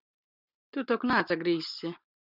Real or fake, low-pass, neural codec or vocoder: real; 5.4 kHz; none